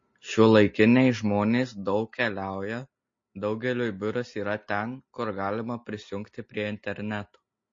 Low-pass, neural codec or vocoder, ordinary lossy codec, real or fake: 7.2 kHz; none; MP3, 32 kbps; real